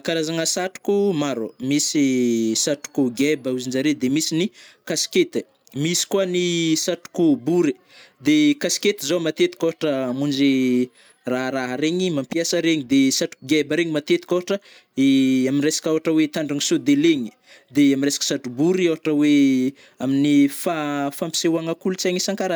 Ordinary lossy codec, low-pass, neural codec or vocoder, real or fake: none; none; none; real